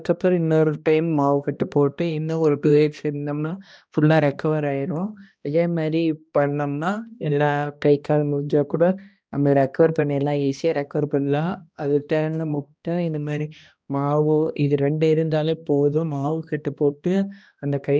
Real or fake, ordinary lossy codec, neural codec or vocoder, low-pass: fake; none; codec, 16 kHz, 1 kbps, X-Codec, HuBERT features, trained on balanced general audio; none